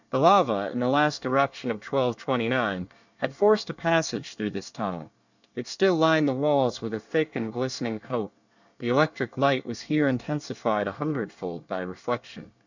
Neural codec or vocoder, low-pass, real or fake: codec, 24 kHz, 1 kbps, SNAC; 7.2 kHz; fake